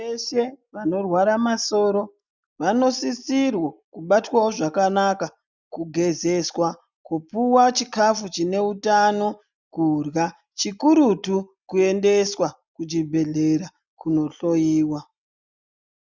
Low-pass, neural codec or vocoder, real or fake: 7.2 kHz; none; real